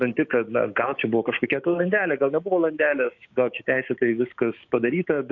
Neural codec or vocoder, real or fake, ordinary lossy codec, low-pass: codec, 16 kHz, 6 kbps, DAC; fake; AAC, 48 kbps; 7.2 kHz